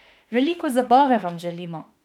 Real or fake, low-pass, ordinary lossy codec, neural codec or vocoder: fake; 19.8 kHz; none; autoencoder, 48 kHz, 32 numbers a frame, DAC-VAE, trained on Japanese speech